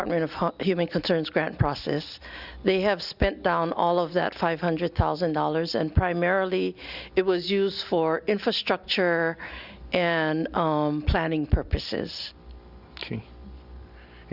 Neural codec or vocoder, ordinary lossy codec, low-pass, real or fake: none; Opus, 64 kbps; 5.4 kHz; real